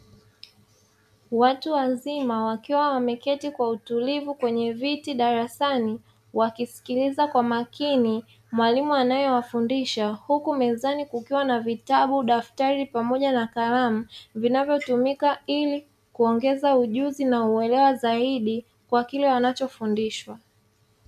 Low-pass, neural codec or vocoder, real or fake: 14.4 kHz; none; real